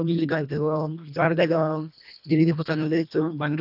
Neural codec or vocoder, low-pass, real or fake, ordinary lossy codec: codec, 24 kHz, 1.5 kbps, HILCodec; 5.4 kHz; fake; none